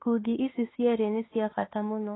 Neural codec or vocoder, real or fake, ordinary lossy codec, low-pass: autoencoder, 48 kHz, 32 numbers a frame, DAC-VAE, trained on Japanese speech; fake; AAC, 16 kbps; 7.2 kHz